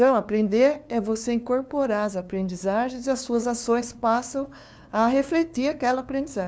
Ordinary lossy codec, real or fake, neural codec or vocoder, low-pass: none; fake; codec, 16 kHz, 2 kbps, FunCodec, trained on LibriTTS, 25 frames a second; none